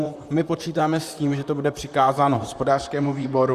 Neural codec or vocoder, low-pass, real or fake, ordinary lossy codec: vocoder, 44.1 kHz, 128 mel bands, Pupu-Vocoder; 14.4 kHz; fake; Opus, 64 kbps